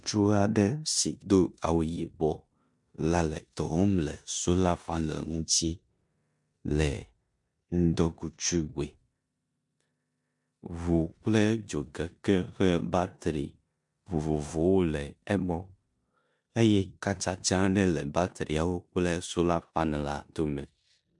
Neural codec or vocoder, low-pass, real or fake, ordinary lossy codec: codec, 16 kHz in and 24 kHz out, 0.9 kbps, LongCat-Audio-Codec, four codebook decoder; 10.8 kHz; fake; MP3, 64 kbps